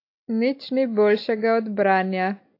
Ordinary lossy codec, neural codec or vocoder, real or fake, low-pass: AAC, 32 kbps; none; real; 5.4 kHz